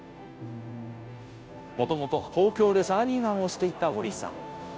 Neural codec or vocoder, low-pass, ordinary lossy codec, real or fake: codec, 16 kHz, 0.5 kbps, FunCodec, trained on Chinese and English, 25 frames a second; none; none; fake